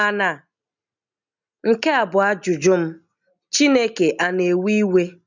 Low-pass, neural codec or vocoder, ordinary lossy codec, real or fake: 7.2 kHz; none; none; real